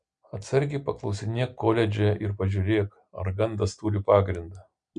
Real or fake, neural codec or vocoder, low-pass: real; none; 9.9 kHz